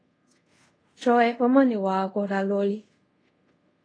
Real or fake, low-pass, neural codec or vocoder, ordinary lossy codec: fake; 9.9 kHz; codec, 24 kHz, 0.5 kbps, DualCodec; AAC, 32 kbps